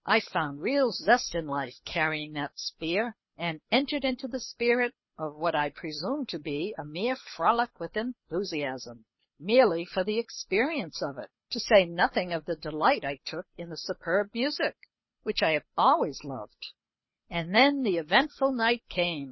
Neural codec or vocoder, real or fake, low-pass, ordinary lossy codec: codec, 24 kHz, 6 kbps, HILCodec; fake; 7.2 kHz; MP3, 24 kbps